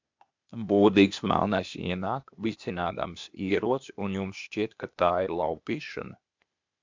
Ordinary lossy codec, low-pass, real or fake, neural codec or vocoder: MP3, 64 kbps; 7.2 kHz; fake; codec, 16 kHz, 0.8 kbps, ZipCodec